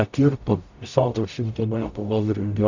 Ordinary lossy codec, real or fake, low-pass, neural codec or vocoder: MP3, 64 kbps; fake; 7.2 kHz; codec, 44.1 kHz, 0.9 kbps, DAC